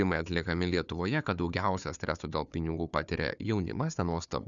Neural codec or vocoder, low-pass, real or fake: codec, 16 kHz, 4 kbps, FunCodec, trained on Chinese and English, 50 frames a second; 7.2 kHz; fake